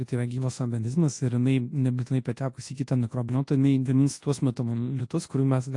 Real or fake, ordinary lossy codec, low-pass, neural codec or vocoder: fake; AAC, 48 kbps; 10.8 kHz; codec, 24 kHz, 0.9 kbps, WavTokenizer, large speech release